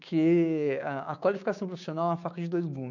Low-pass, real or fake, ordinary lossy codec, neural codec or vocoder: 7.2 kHz; fake; none; codec, 16 kHz, 6 kbps, DAC